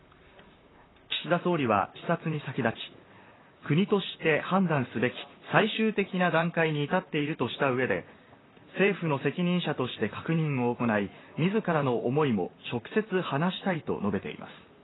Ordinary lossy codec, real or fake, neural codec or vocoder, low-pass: AAC, 16 kbps; fake; vocoder, 44.1 kHz, 128 mel bands, Pupu-Vocoder; 7.2 kHz